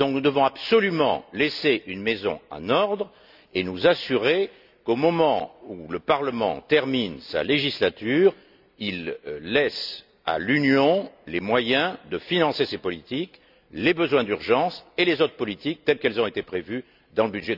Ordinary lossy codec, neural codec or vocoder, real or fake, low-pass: none; none; real; 5.4 kHz